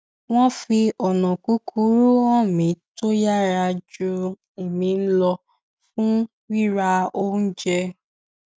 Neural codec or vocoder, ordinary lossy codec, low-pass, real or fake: none; none; none; real